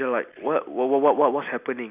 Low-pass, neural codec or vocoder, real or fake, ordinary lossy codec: 3.6 kHz; none; real; none